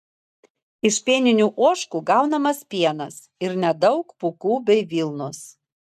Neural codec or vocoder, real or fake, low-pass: none; real; 14.4 kHz